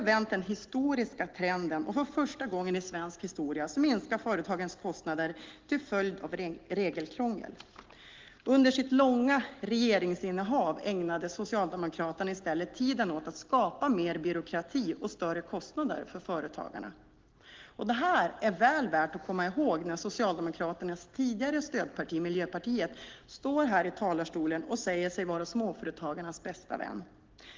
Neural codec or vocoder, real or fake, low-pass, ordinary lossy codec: none; real; 7.2 kHz; Opus, 16 kbps